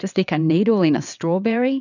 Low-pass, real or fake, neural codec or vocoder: 7.2 kHz; fake; vocoder, 22.05 kHz, 80 mel bands, WaveNeXt